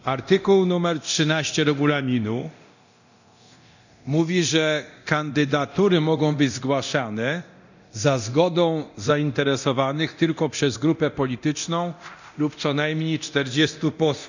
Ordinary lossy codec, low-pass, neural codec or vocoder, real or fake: none; 7.2 kHz; codec, 24 kHz, 0.9 kbps, DualCodec; fake